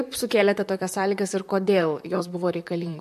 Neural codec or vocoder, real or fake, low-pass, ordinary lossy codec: vocoder, 44.1 kHz, 128 mel bands, Pupu-Vocoder; fake; 14.4 kHz; MP3, 64 kbps